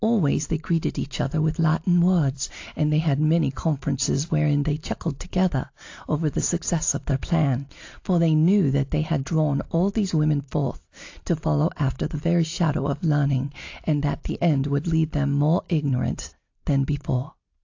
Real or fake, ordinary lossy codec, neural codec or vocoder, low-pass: real; AAC, 48 kbps; none; 7.2 kHz